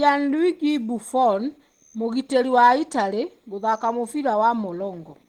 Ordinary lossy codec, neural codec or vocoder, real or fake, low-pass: Opus, 16 kbps; none; real; 19.8 kHz